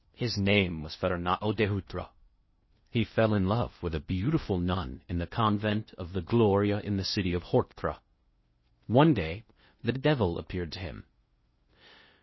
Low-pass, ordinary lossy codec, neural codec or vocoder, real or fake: 7.2 kHz; MP3, 24 kbps; codec, 16 kHz in and 24 kHz out, 0.6 kbps, FocalCodec, streaming, 2048 codes; fake